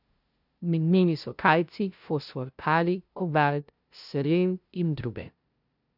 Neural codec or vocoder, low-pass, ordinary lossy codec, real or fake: codec, 16 kHz, 0.5 kbps, FunCodec, trained on LibriTTS, 25 frames a second; 5.4 kHz; none; fake